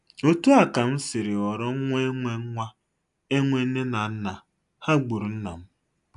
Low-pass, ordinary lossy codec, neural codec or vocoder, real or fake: 10.8 kHz; none; none; real